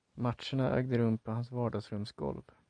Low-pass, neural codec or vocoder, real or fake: 9.9 kHz; none; real